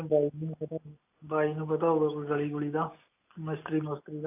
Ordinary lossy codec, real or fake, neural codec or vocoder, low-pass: AAC, 32 kbps; real; none; 3.6 kHz